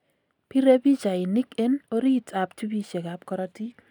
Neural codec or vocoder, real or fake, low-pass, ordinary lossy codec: none; real; 19.8 kHz; none